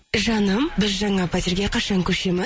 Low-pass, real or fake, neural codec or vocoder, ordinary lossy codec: none; real; none; none